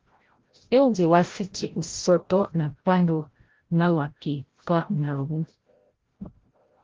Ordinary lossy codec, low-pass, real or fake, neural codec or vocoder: Opus, 16 kbps; 7.2 kHz; fake; codec, 16 kHz, 0.5 kbps, FreqCodec, larger model